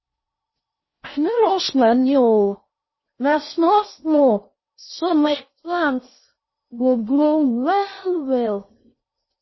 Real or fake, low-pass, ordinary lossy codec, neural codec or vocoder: fake; 7.2 kHz; MP3, 24 kbps; codec, 16 kHz in and 24 kHz out, 0.6 kbps, FocalCodec, streaming, 4096 codes